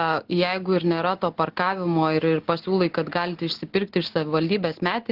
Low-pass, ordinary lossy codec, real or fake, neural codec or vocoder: 5.4 kHz; Opus, 16 kbps; real; none